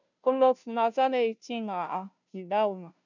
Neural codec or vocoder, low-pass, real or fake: codec, 16 kHz, 0.5 kbps, FunCodec, trained on Chinese and English, 25 frames a second; 7.2 kHz; fake